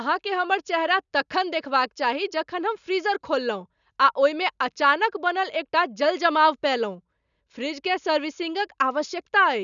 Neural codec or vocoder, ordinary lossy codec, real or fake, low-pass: none; none; real; 7.2 kHz